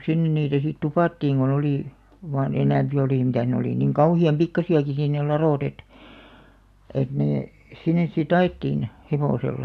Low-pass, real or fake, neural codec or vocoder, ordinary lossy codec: 14.4 kHz; real; none; MP3, 96 kbps